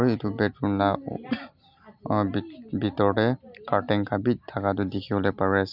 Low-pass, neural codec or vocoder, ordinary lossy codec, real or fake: 5.4 kHz; none; none; real